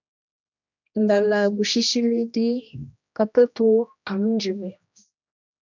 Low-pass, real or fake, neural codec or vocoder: 7.2 kHz; fake; codec, 16 kHz, 1 kbps, X-Codec, HuBERT features, trained on general audio